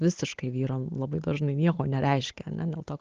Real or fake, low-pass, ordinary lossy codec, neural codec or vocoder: real; 7.2 kHz; Opus, 16 kbps; none